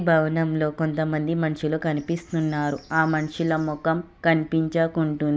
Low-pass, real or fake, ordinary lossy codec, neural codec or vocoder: none; real; none; none